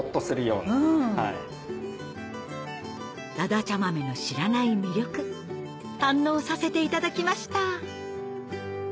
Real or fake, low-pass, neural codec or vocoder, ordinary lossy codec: real; none; none; none